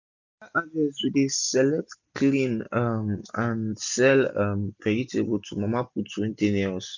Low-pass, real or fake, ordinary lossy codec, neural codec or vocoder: 7.2 kHz; real; none; none